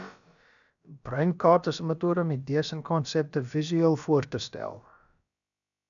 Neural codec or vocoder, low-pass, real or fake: codec, 16 kHz, about 1 kbps, DyCAST, with the encoder's durations; 7.2 kHz; fake